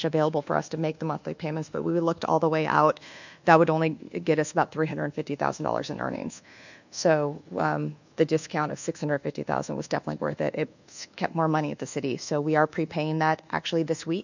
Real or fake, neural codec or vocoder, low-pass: fake; codec, 24 kHz, 1.2 kbps, DualCodec; 7.2 kHz